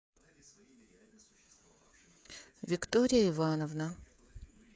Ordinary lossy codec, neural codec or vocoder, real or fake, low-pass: none; codec, 16 kHz, 16 kbps, FreqCodec, smaller model; fake; none